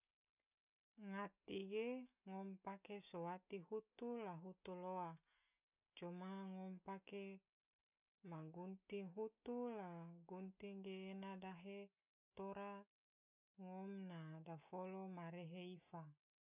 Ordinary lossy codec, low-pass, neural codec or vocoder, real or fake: none; 3.6 kHz; none; real